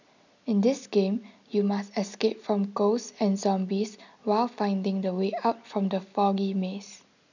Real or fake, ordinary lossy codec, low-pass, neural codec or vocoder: real; none; 7.2 kHz; none